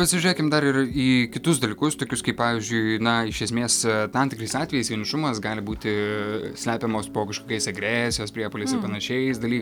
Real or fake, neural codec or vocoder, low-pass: real; none; 19.8 kHz